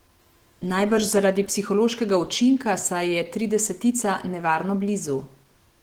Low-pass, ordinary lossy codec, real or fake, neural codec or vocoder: 19.8 kHz; Opus, 16 kbps; fake; autoencoder, 48 kHz, 128 numbers a frame, DAC-VAE, trained on Japanese speech